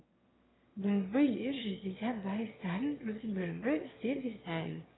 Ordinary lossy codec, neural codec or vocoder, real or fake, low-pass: AAC, 16 kbps; autoencoder, 22.05 kHz, a latent of 192 numbers a frame, VITS, trained on one speaker; fake; 7.2 kHz